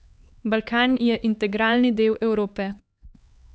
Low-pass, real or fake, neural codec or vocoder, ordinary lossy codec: none; fake; codec, 16 kHz, 4 kbps, X-Codec, HuBERT features, trained on LibriSpeech; none